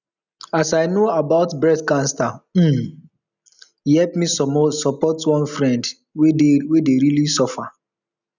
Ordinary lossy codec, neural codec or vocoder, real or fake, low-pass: none; none; real; 7.2 kHz